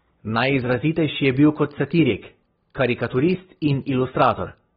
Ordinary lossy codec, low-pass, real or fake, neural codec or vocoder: AAC, 16 kbps; 19.8 kHz; real; none